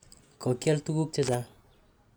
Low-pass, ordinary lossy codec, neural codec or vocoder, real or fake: none; none; none; real